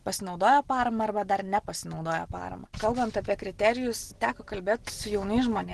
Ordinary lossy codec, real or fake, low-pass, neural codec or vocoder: Opus, 16 kbps; real; 10.8 kHz; none